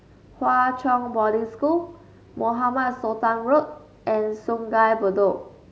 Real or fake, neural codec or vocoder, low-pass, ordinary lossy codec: real; none; none; none